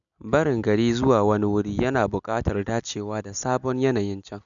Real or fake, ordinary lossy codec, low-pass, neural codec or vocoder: real; none; 7.2 kHz; none